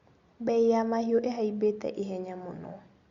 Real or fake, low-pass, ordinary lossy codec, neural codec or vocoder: real; 7.2 kHz; Opus, 64 kbps; none